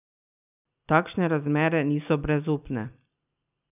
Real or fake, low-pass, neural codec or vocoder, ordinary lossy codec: real; 3.6 kHz; none; none